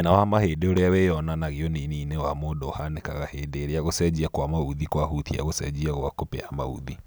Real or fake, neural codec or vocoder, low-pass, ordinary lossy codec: real; none; none; none